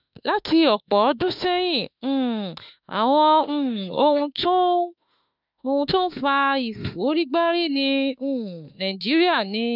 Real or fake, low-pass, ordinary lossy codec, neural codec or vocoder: fake; 5.4 kHz; none; autoencoder, 48 kHz, 32 numbers a frame, DAC-VAE, trained on Japanese speech